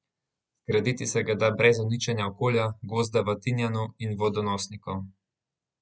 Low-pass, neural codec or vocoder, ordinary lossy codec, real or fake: none; none; none; real